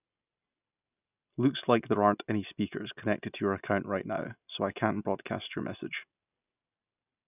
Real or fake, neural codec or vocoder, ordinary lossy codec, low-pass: real; none; none; 3.6 kHz